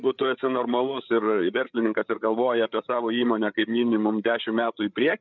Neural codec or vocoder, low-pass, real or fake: codec, 16 kHz, 8 kbps, FreqCodec, larger model; 7.2 kHz; fake